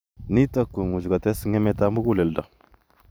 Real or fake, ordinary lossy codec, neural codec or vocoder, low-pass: fake; none; vocoder, 44.1 kHz, 128 mel bands every 512 samples, BigVGAN v2; none